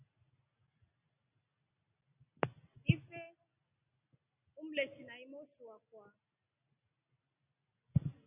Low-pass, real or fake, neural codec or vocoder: 3.6 kHz; real; none